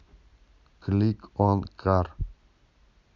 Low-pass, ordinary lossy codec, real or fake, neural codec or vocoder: 7.2 kHz; none; real; none